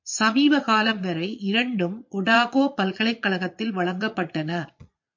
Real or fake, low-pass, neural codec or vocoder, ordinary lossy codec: fake; 7.2 kHz; vocoder, 22.05 kHz, 80 mel bands, Vocos; MP3, 48 kbps